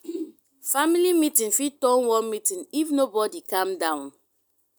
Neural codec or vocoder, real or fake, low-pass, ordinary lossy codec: none; real; none; none